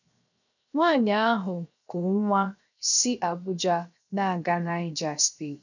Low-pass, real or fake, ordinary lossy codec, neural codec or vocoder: 7.2 kHz; fake; none; codec, 16 kHz, 0.7 kbps, FocalCodec